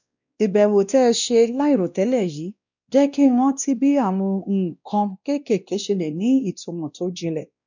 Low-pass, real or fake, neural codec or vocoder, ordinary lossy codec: 7.2 kHz; fake; codec, 16 kHz, 1 kbps, X-Codec, WavLM features, trained on Multilingual LibriSpeech; none